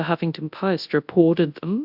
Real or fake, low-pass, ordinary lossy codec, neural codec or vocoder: fake; 5.4 kHz; AAC, 48 kbps; codec, 24 kHz, 0.9 kbps, WavTokenizer, large speech release